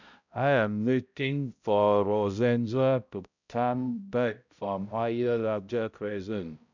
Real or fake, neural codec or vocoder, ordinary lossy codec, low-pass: fake; codec, 16 kHz, 0.5 kbps, X-Codec, HuBERT features, trained on balanced general audio; none; 7.2 kHz